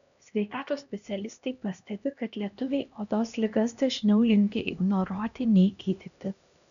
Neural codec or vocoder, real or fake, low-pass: codec, 16 kHz, 1 kbps, X-Codec, HuBERT features, trained on LibriSpeech; fake; 7.2 kHz